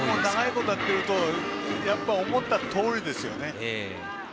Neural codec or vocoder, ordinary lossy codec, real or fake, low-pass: none; none; real; none